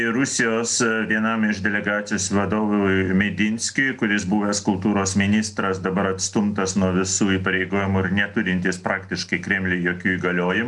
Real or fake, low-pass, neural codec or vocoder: real; 10.8 kHz; none